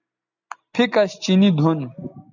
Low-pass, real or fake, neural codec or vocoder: 7.2 kHz; real; none